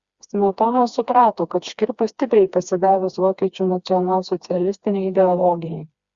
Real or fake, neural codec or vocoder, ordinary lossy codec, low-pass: fake; codec, 16 kHz, 2 kbps, FreqCodec, smaller model; Opus, 64 kbps; 7.2 kHz